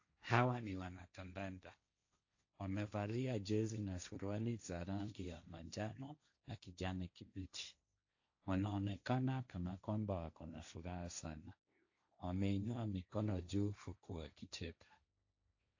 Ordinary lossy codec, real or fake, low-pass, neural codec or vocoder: AAC, 48 kbps; fake; 7.2 kHz; codec, 16 kHz, 1.1 kbps, Voila-Tokenizer